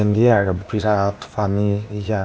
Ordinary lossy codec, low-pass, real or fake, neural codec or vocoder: none; none; fake; codec, 16 kHz, 0.8 kbps, ZipCodec